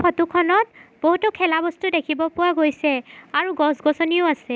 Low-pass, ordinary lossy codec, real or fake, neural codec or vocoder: none; none; real; none